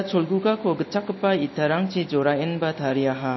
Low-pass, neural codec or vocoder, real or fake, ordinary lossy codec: 7.2 kHz; none; real; MP3, 24 kbps